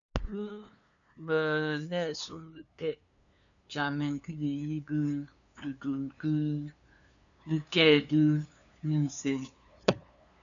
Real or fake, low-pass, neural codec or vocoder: fake; 7.2 kHz; codec, 16 kHz, 2 kbps, FunCodec, trained on LibriTTS, 25 frames a second